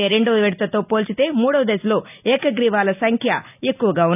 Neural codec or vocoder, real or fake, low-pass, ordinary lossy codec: none; real; 3.6 kHz; none